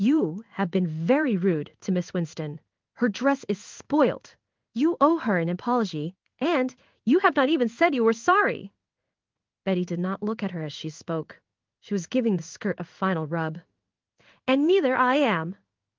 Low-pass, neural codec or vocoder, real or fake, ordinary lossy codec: 7.2 kHz; codec, 16 kHz in and 24 kHz out, 1 kbps, XY-Tokenizer; fake; Opus, 24 kbps